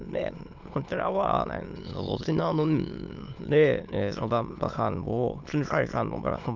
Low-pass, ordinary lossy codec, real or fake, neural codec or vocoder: 7.2 kHz; Opus, 24 kbps; fake; autoencoder, 22.05 kHz, a latent of 192 numbers a frame, VITS, trained on many speakers